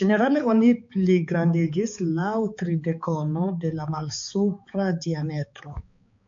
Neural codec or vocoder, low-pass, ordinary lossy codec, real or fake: codec, 16 kHz, 4 kbps, X-Codec, HuBERT features, trained on balanced general audio; 7.2 kHz; MP3, 48 kbps; fake